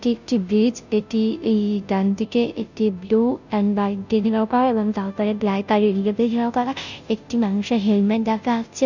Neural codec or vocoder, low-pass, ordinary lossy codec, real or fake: codec, 16 kHz, 0.5 kbps, FunCodec, trained on Chinese and English, 25 frames a second; 7.2 kHz; none; fake